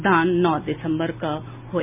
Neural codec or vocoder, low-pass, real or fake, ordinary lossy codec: none; 3.6 kHz; real; MP3, 32 kbps